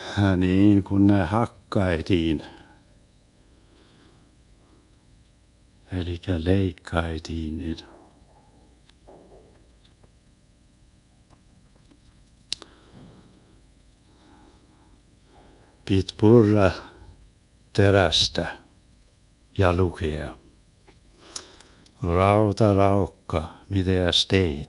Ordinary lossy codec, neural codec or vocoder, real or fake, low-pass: none; codec, 24 kHz, 1.2 kbps, DualCodec; fake; 10.8 kHz